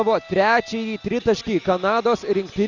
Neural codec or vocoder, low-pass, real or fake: none; 7.2 kHz; real